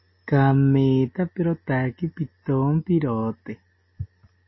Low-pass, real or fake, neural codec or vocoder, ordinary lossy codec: 7.2 kHz; real; none; MP3, 24 kbps